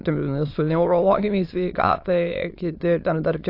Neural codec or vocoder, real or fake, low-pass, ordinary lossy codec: autoencoder, 22.05 kHz, a latent of 192 numbers a frame, VITS, trained on many speakers; fake; 5.4 kHz; AAC, 32 kbps